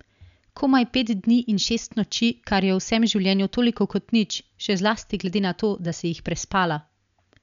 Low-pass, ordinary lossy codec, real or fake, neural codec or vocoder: 7.2 kHz; none; real; none